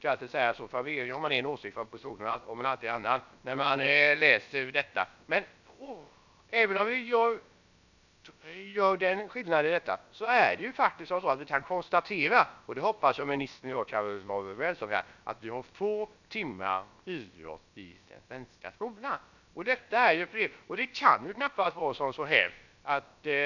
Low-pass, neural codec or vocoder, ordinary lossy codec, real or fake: 7.2 kHz; codec, 16 kHz, about 1 kbps, DyCAST, with the encoder's durations; none; fake